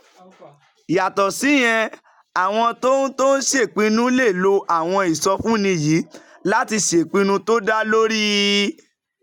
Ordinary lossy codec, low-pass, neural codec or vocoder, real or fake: none; 19.8 kHz; none; real